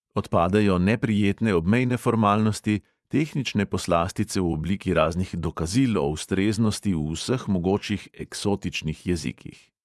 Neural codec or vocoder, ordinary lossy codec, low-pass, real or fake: vocoder, 24 kHz, 100 mel bands, Vocos; none; none; fake